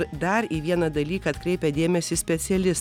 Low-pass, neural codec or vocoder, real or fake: 19.8 kHz; none; real